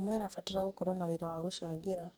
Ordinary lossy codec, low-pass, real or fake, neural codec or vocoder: none; none; fake; codec, 44.1 kHz, 2.6 kbps, DAC